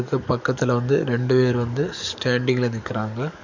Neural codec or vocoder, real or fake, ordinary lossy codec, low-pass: codec, 44.1 kHz, 7.8 kbps, DAC; fake; none; 7.2 kHz